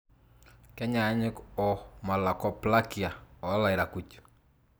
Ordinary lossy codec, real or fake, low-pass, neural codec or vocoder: none; real; none; none